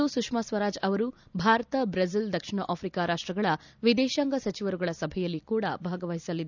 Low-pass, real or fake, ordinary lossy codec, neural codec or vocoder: 7.2 kHz; real; none; none